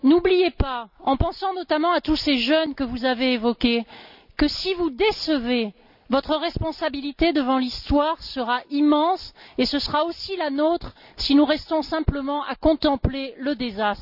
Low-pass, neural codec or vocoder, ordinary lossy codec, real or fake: 5.4 kHz; none; none; real